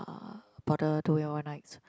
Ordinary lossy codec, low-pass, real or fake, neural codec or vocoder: none; none; real; none